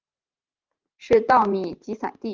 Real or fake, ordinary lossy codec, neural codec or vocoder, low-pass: fake; Opus, 32 kbps; vocoder, 44.1 kHz, 128 mel bands every 512 samples, BigVGAN v2; 7.2 kHz